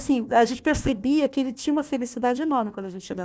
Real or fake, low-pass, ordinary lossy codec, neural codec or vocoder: fake; none; none; codec, 16 kHz, 1 kbps, FunCodec, trained on Chinese and English, 50 frames a second